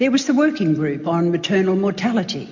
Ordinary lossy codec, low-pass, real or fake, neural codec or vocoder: MP3, 48 kbps; 7.2 kHz; real; none